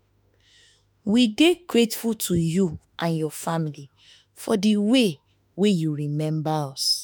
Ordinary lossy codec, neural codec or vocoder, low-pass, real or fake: none; autoencoder, 48 kHz, 32 numbers a frame, DAC-VAE, trained on Japanese speech; none; fake